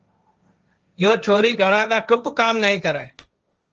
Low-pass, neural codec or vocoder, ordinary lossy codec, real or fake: 7.2 kHz; codec, 16 kHz, 1.1 kbps, Voila-Tokenizer; Opus, 24 kbps; fake